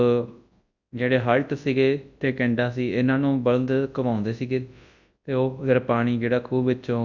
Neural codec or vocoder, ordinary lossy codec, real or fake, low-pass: codec, 24 kHz, 0.9 kbps, WavTokenizer, large speech release; Opus, 64 kbps; fake; 7.2 kHz